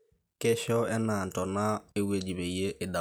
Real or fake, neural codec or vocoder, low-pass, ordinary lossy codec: real; none; none; none